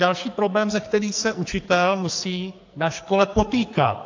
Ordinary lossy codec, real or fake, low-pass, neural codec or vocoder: AAC, 48 kbps; fake; 7.2 kHz; codec, 44.1 kHz, 2.6 kbps, SNAC